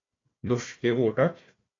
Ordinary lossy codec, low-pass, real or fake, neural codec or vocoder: AAC, 32 kbps; 7.2 kHz; fake; codec, 16 kHz, 1 kbps, FunCodec, trained on Chinese and English, 50 frames a second